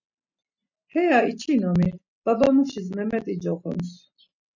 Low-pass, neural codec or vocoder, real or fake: 7.2 kHz; none; real